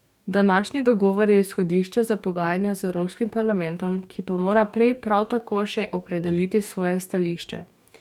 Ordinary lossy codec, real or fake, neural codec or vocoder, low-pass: none; fake; codec, 44.1 kHz, 2.6 kbps, DAC; 19.8 kHz